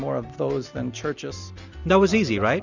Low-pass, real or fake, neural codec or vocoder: 7.2 kHz; real; none